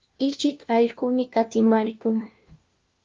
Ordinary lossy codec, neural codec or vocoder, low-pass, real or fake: Opus, 32 kbps; codec, 16 kHz, 1 kbps, FunCodec, trained on LibriTTS, 50 frames a second; 7.2 kHz; fake